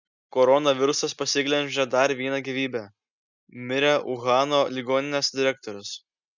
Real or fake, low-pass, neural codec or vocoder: real; 7.2 kHz; none